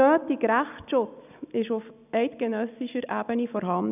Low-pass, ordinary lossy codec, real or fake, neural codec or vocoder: 3.6 kHz; none; real; none